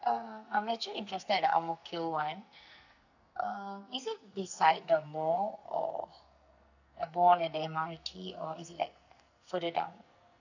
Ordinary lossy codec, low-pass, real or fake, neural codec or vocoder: none; 7.2 kHz; fake; codec, 44.1 kHz, 2.6 kbps, SNAC